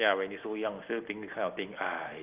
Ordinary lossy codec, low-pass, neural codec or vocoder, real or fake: Opus, 24 kbps; 3.6 kHz; none; real